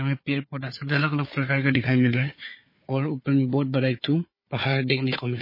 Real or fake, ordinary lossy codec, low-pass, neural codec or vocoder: fake; MP3, 24 kbps; 5.4 kHz; codec, 16 kHz, 4 kbps, FunCodec, trained on Chinese and English, 50 frames a second